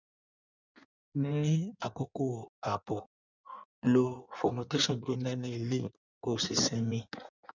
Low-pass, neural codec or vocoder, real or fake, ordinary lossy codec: 7.2 kHz; codec, 16 kHz in and 24 kHz out, 1.1 kbps, FireRedTTS-2 codec; fake; none